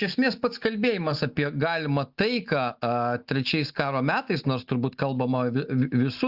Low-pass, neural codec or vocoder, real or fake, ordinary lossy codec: 5.4 kHz; none; real; Opus, 64 kbps